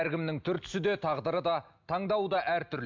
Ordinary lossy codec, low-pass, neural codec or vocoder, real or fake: MP3, 64 kbps; 7.2 kHz; none; real